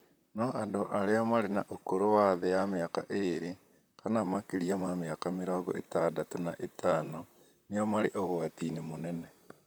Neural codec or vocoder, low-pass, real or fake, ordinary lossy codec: vocoder, 44.1 kHz, 128 mel bands, Pupu-Vocoder; none; fake; none